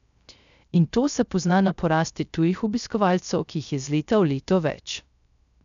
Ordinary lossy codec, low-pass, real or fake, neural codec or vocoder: none; 7.2 kHz; fake; codec, 16 kHz, 0.3 kbps, FocalCodec